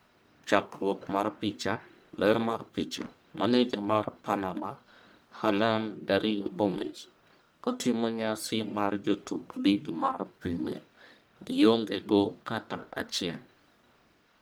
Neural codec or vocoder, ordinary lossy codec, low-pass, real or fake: codec, 44.1 kHz, 1.7 kbps, Pupu-Codec; none; none; fake